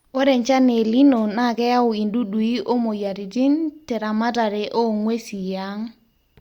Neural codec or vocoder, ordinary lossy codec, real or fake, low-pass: none; Opus, 64 kbps; real; 19.8 kHz